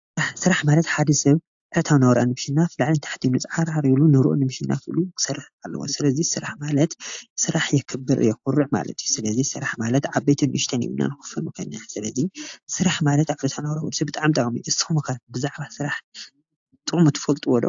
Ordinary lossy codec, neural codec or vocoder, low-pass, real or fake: AAC, 64 kbps; none; 7.2 kHz; real